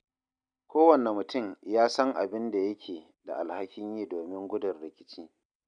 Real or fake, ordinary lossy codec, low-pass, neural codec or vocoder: real; none; 9.9 kHz; none